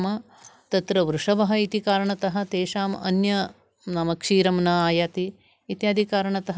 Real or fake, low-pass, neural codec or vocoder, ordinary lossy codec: real; none; none; none